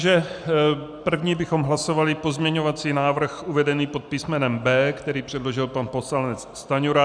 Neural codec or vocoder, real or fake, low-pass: none; real; 9.9 kHz